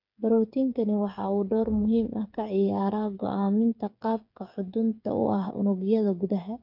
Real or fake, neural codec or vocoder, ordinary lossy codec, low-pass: fake; codec, 16 kHz, 8 kbps, FreqCodec, smaller model; none; 5.4 kHz